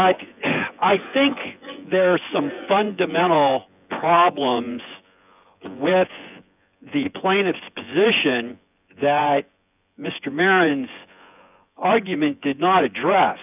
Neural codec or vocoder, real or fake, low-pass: vocoder, 24 kHz, 100 mel bands, Vocos; fake; 3.6 kHz